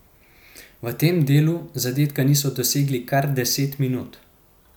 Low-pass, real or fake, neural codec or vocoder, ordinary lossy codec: 19.8 kHz; real; none; none